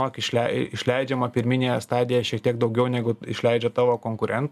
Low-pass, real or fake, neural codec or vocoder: 14.4 kHz; real; none